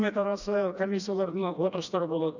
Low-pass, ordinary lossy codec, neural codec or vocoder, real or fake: 7.2 kHz; none; codec, 16 kHz, 1 kbps, FreqCodec, smaller model; fake